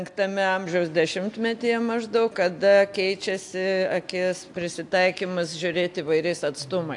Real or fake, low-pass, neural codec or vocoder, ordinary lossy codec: real; 10.8 kHz; none; AAC, 64 kbps